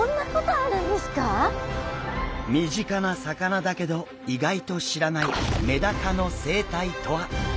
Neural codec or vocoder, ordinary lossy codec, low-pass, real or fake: none; none; none; real